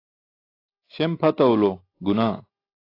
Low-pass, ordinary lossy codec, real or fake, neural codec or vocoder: 5.4 kHz; AAC, 24 kbps; real; none